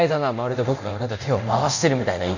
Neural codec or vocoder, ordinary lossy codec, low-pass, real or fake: codec, 24 kHz, 0.9 kbps, DualCodec; none; 7.2 kHz; fake